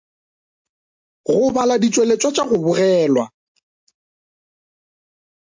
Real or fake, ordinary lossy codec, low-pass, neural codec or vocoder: real; MP3, 48 kbps; 7.2 kHz; none